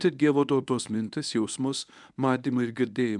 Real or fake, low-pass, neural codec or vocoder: fake; 10.8 kHz; codec, 24 kHz, 0.9 kbps, WavTokenizer, medium speech release version 1